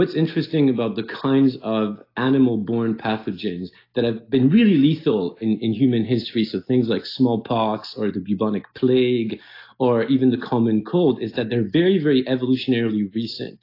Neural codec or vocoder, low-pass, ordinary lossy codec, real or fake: none; 5.4 kHz; AAC, 32 kbps; real